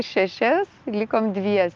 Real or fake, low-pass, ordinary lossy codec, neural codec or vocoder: real; 7.2 kHz; Opus, 32 kbps; none